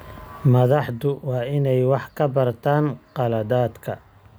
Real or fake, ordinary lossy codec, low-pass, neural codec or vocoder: real; none; none; none